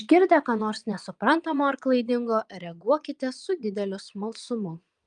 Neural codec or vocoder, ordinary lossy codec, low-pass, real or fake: vocoder, 22.05 kHz, 80 mel bands, Vocos; Opus, 32 kbps; 9.9 kHz; fake